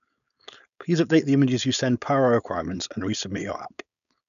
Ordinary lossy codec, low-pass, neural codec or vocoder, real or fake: none; 7.2 kHz; codec, 16 kHz, 4.8 kbps, FACodec; fake